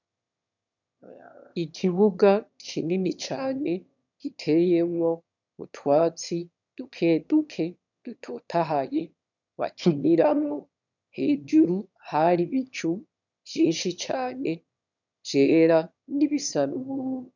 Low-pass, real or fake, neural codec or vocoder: 7.2 kHz; fake; autoencoder, 22.05 kHz, a latent of 192 numbers a frame, VITS, trained on one speaker